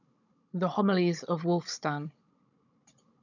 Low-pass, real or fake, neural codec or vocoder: 7.2 kHz; fake; codec, 16 kHz, 16 kbps, FunCodec, trained on Chinese and English, 50 frames a second